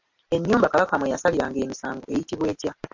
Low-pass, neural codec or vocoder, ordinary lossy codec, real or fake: 7.2 kHz; none; MP3, 48 kbps; real